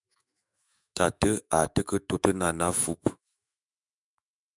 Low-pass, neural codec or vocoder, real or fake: 10.8 kHz; autoencoder, 48 kHz, 128 numbers a frame, DAC-VAE, trained on Japanese speech; fake